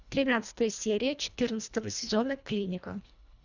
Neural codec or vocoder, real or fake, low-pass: codec, 24 kHz, 1.5 kbps, HILCodec; fake; 7.2 kHz